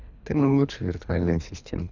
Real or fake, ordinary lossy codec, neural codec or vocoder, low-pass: fake; none; codec, 24 kHz, 3 kbps, HILCodec; 7.2 kHz